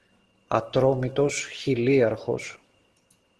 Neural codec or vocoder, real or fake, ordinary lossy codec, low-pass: none; real; Opus, 16 kbps; 10.8 kHz